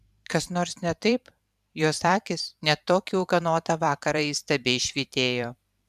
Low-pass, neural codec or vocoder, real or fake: 14.4 kHz; vocoder, 44.1 kHz, 128 mel bands every 512 samples, BigVGAN v2; fake